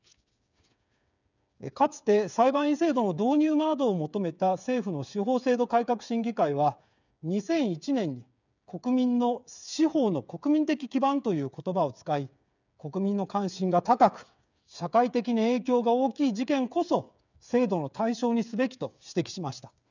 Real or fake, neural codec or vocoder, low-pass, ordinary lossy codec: fake; codec, 16 kHz, 8 kbps, FreqCodec, smaller model; 7.2 kHz; none